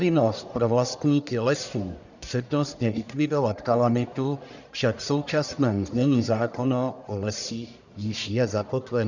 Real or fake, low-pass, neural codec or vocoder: fake; 7.2 kHz; codec, 44.1 kHz, 1.7 kbps, Pupu-Codec